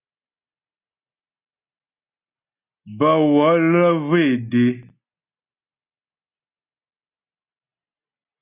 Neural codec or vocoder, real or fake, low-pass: none; real; 3.6 kHz